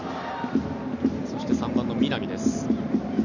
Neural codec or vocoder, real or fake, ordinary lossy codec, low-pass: none; real; none; 7.2 kHz